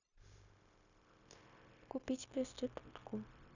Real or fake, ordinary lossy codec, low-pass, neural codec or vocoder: fake; none; 7.2 kHz; codec, 16 kHz, 0.9 kbps, LongCat-Audio-Codec